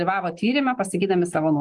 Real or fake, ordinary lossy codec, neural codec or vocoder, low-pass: real; Opus, 24 kbps; none; 10.8 kHz